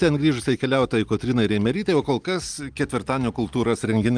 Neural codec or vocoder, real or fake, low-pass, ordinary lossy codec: none; real; 9.9 kHz; Opus, 32 kbps